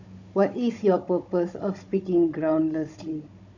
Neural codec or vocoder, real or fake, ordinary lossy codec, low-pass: codec, 16 kHz, 16 kbps, FunCodec, trained on Chinese and English, 50 frames a second; fake; none; 7.2 kHz